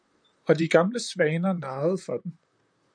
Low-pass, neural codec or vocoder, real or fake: 9.9 kHz; vocoder, 44.1 kHz, 128 mel bands, Pupu-Vocoder; fake